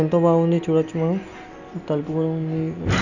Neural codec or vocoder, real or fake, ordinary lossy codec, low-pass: none; real; none; 7.2 kHz